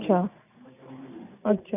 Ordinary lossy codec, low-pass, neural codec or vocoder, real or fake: none; 3.6 kHz; none; real